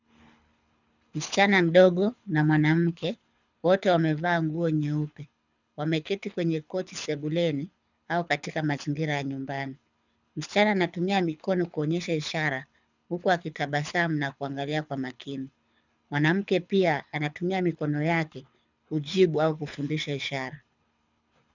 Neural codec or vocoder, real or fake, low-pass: codec, 24 kHz, 6 kbps, HILCodec; fake; 7.2 kHz